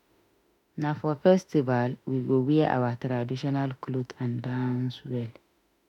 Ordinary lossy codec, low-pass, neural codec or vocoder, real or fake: none; 19.8 kHz; autoencoder, 48 kHz, 32 numbers a frame, DAC-VAE, trained on Japanese speech; fake